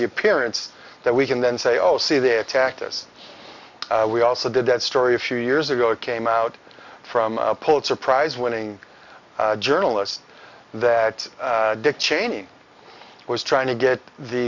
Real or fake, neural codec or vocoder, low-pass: real; none; 7.2 kHz